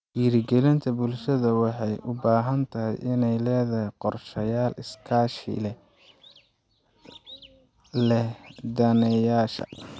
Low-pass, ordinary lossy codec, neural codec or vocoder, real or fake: none; none; none; real